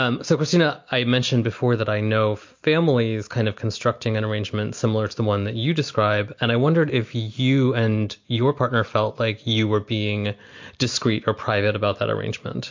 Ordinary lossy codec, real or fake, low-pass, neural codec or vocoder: MP3, 48 kbps; real; 7.2 kHz; none